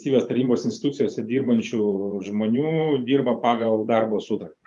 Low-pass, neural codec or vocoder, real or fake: 10.8 kHz; vocoder, 24 kHz, 100 mel bands, Vocos; fake